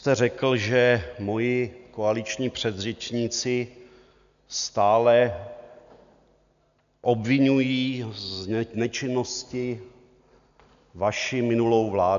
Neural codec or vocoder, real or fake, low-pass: none; real; 7.2 kHz